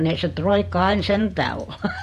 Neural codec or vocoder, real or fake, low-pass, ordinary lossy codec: vocoder, 48 kHz, 128 mel bands, Vocos; fake; 14.4 kHz; MP3, 64 kbps